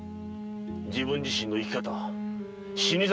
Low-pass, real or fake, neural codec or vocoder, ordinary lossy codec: none; real; none; none